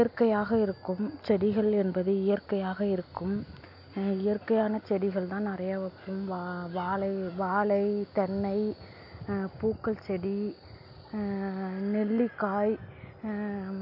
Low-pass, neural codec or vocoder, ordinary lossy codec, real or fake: 5.4 kHz; none; AAC, 48 kbps; real